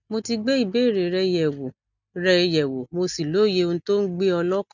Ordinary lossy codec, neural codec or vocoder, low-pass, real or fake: none; none; 7.2 kHz; real